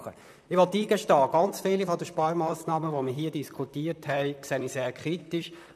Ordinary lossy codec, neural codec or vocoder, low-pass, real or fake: none; vocoder, 44.1 kHz, 128 mel bands, Pupu-Vocoder; 14.4 kHz; fake